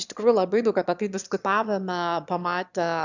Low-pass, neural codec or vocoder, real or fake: 7.2 kHz; autoencoder, 22.05 kHz, a latent of 192 numbers a frame, VITS, trained on one speaker; fake